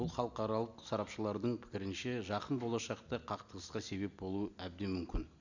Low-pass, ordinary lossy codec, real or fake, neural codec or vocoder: 7.2 kHz; none; real; none